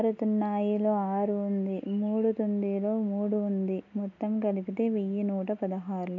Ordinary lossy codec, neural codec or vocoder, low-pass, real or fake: none; none; 7.2 kHz; real